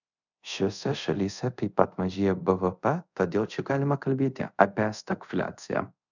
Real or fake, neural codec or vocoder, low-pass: fake; codec, 24 kHz, 0.5 kbps, DualCodec; 7.2 kHz